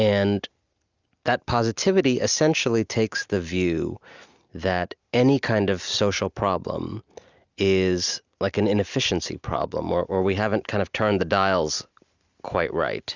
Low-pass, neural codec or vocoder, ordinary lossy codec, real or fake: 7.2 kHz; none; Opus, 64 kbps; real